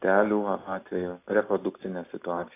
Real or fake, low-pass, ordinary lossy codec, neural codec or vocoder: real; 3.6 kHz; AAC, 24 kbps; none